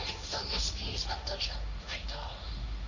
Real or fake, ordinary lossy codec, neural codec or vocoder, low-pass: fake; none; codec, 16 kHz, 1.1 kbps, Voila-Tokenizer; 7.2 kHz